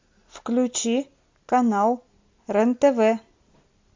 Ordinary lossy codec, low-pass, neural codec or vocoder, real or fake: MP3, 48 kbps; 7.2 kHz; none; real